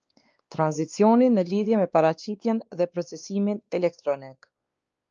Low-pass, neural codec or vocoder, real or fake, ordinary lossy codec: 7.2 kHz; codec, 16 kHz, 2 kbps, X-Codec, WavLM features, trained on Multilingual LibriSpeech; fake; Opus, 24 kbps